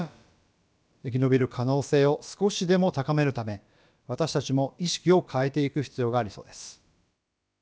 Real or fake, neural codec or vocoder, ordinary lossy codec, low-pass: fake; codec, 16 kHz, about 1 kbps, DyCAST, with the encoder's durations; none; none